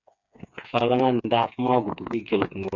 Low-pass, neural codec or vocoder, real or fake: 7.2 kHz; codec, 16 kHz, 4 kbps, FreqCodec, smaller model; fake